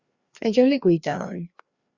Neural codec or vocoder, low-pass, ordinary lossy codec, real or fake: codec, 16 kHz, 2 kbps, FreqCodec, larger model; 7.2 kHz; Opus, 64 kbps; fake